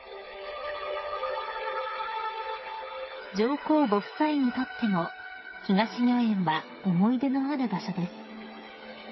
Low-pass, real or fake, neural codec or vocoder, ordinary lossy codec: 7.2 kHz; fake; codec, 16 kHz, 8 kbps, FreqCodec, smaller model; MP3, 24 kbps